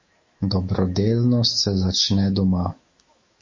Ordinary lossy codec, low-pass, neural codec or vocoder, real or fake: MP3, 32 kbps; 7.2 kHz; autoencoder, 48 kHz, 128 numbers a frame, DAC-VAE, trained on Japanese speech; fake